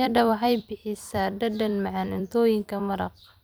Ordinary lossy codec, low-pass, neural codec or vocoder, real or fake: none; none; vocoder, 44.1 kHz, 128 mel bands every 256 samples, BigVGAN v2; fake